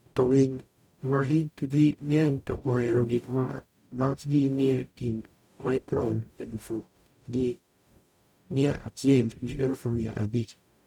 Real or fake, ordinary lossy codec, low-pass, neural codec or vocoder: fake; none; 19.8 kHz; codec, 44.1 kHz, 0.9 kbps, DAC